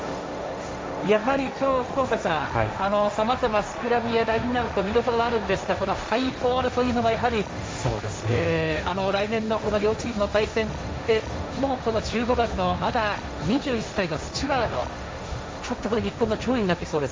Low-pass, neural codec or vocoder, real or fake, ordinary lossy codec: none; codec, 16 kHz, 1.1 kbps, Voila-Tokenizer; fake; none